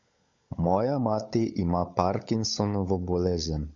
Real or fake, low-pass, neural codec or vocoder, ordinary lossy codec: fake; 7.2 kHz; codec, 16 kHz, 16 kbps, FunCodec, trained on LibriTTS, 50 frames a second; MP3, 64 kbps